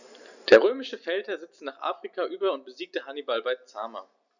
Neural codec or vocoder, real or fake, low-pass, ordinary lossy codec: none; real; 7.2 kHz; none